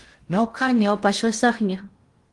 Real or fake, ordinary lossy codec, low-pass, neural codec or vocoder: fake; Opus, 24 kbps; 10.8 kHz; codec, 16 kHz in and 24 kHz out, 0.6 kbps, FocalCodec, streaming, 2048 codes